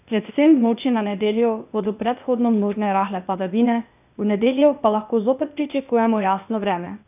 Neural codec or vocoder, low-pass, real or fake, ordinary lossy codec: codec, 16 kHz, 0.8 kbps, ZipCodec; 3.6 kHz; fake; none